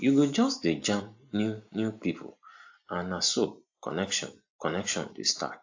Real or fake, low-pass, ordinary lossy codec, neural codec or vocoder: real; 7.2 kHz; AAC, 48 kbps; none